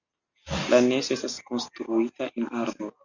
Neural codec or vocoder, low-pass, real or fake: none; 7.2 kHz; real